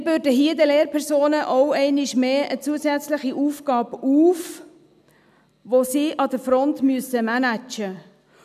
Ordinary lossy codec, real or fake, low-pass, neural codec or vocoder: none; real; 14.4 kHz; none